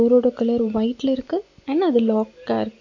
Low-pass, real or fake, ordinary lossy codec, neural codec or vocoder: 7.2 kHz; real; MP3, 48 kbps; none